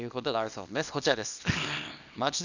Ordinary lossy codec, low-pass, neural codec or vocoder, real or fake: none; 7.2 kHz; codec, 24 kHz, 0.9 kbps, WavTokenizer, small release; fake